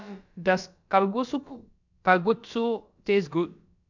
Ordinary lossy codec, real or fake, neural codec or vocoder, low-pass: none; fake; codec, 16 kHz, about 1 kbps, DyCAST, with the encoder's durations; 7.2 kHz